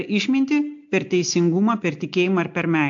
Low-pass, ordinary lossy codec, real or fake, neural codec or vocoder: 7.2 kHz; AAC, 64 kbps; real; none